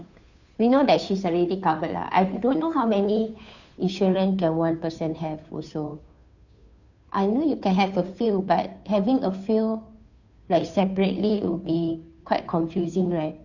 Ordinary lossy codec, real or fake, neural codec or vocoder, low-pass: none; fake; codec, 16 kHz, 2 kbps, FunCodec, trained on Chinese and English, 25 frames a second; 7.2 kHz